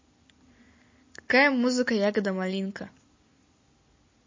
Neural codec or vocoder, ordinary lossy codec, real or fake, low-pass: none; MP3, 32 kbps; real; 7.2 kHz